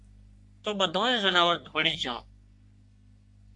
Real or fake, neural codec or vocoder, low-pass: fake; codec, 44.1 kHz, 3.4 kbps, Pupu-Codec; 10.8 kHz